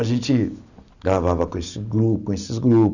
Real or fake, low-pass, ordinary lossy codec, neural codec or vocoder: real; 7.2 kHz; none; none